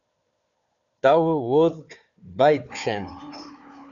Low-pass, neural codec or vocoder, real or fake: 7.2 kHz; codec, 16 kHz, 4 kbps, FunCodec, trained on Chinese and English, 50 frames a second; fake